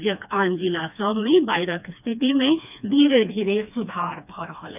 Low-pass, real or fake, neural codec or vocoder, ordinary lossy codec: 3.6 kHz; fake; codec, 16 kHz, 2 kbps, FreqCodec, smaller model; none